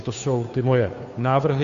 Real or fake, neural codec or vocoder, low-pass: fake; codec, 16 kHz, 2 kbps, FunCodec, trained on Chinese and English, 25 frames a second; 7.2 kHz